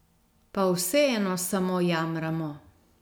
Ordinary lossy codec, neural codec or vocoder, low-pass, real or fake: none; none; none; real